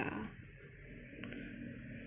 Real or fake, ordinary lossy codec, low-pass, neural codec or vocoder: real; MP3, 32 kbps; 3.6 kHz; none